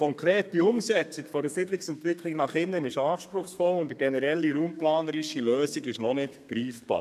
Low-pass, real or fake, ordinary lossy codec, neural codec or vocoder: 14.4 kHz; fake; none; codec, 32 kHz, 1.9 kbps, SNAC